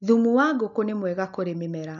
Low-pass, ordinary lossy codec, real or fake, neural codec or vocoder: 7.2 kHz; none; real; none